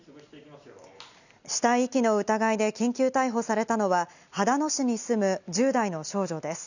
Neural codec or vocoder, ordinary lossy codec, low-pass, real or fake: none; none; 7.2 kHz; real